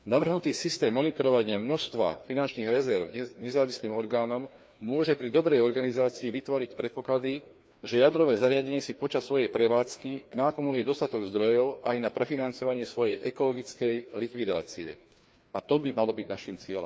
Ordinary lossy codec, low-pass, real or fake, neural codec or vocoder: none; none; fake; codec, 16 kHz, 2 kbps, FreqCodec, larger model